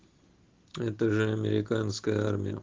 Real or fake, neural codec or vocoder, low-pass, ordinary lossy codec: real; none; 7.2 kHz; Opus, 16 kbps